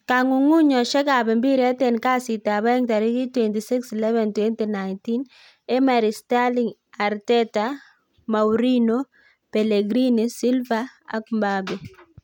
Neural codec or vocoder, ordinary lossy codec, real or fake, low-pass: none; none; real; 19.8 kHz